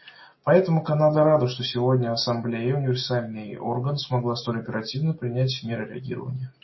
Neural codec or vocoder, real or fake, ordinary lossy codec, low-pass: none; real; MP3, 24 kbps; 7.2 kHz